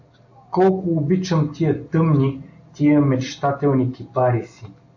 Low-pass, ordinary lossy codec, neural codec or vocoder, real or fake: 7.2 kHz; AAC, 48 kbps; none; real